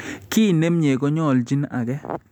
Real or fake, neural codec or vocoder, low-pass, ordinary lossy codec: real; none; 19.8 kHz; none